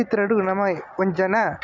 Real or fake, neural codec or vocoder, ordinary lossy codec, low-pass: real; none; none; 7.2 kHz